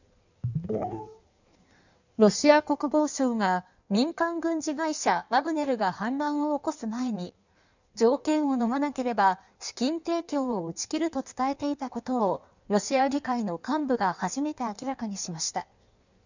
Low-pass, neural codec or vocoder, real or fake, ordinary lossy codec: 7.2 kHz; codec, 16 kHz in and 24 kHz out, 1.1 kbps, FireRedTTS-2 codec; fake; none